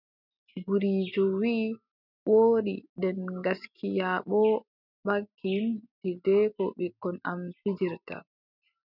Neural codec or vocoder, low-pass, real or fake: none; 5.4 kHz; real